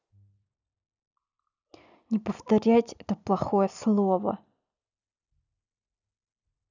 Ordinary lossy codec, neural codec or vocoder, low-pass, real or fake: none; none; 7.2 kHz; real